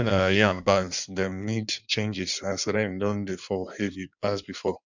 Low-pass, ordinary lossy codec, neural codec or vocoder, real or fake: 7.2 kHz; none; codec, 16 kHz in and 24 kHz out, 1.1 kbps, FireRedTTS-2 codec; fake